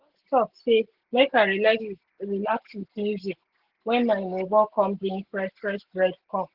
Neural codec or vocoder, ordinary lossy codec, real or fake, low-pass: none; Opus, 16 kbps; real; 5.4 kHz